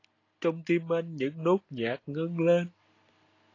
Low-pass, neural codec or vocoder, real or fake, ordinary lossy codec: 7.2 kHz; none; real; AAC, 32 kbps